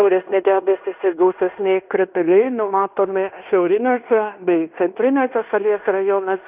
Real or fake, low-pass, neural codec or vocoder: fake; 3.6 kHz; codec, 16 kHz in and 24 kHz out, 0.9 kbps, LongCat-Audio-Codec, fine tuned four codebook decoder